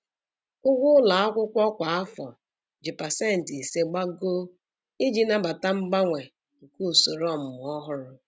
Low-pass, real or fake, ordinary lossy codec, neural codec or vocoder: none; real; none; none